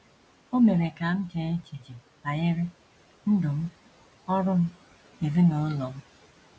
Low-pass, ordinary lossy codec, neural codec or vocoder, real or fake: none; none; none; real